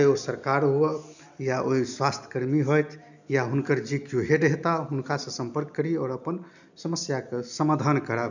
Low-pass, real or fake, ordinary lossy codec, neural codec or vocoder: 7.2 kHz; real; none; none